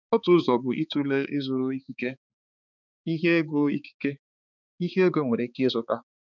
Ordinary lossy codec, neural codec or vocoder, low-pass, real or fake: none; codec, 16 kHz, 4 kbps, X-Codec, HuBERT features, trained on balanced general audio; 7.2 kHz; fake